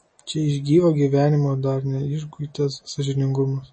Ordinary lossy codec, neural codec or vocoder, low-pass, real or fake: MP3, 32 kbps; none; 9.9 kHz; real